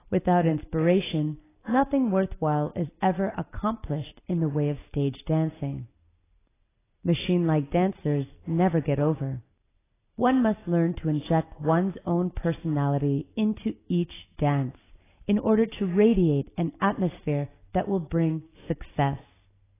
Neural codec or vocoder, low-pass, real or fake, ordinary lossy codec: none; 3.6 kHz; real; AAC, 16 kbps